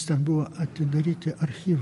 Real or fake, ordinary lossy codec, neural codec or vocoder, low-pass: real; MP3, 48 kbps; none; 14.4 kHz